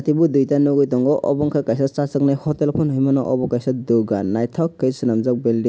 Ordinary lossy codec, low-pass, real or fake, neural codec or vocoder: none; none; real; none